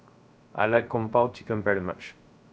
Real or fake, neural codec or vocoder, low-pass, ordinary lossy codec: fake; codec, 16 kHz, 0.3 kbps, FocalCodec; none; none